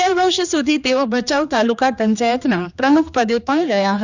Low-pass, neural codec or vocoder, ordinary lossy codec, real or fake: 7.2 kHz; codec, 16 kHz, 2 kbps, X-Codec, HuBERT features, trained on general audio; none; fake